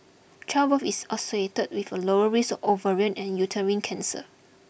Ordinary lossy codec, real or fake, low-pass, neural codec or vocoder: none; real; none; none